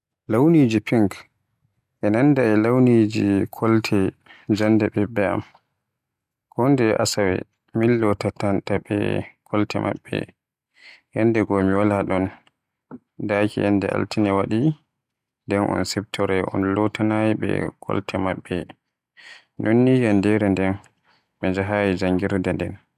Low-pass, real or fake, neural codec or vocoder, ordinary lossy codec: 14.4 kHz; real; none; none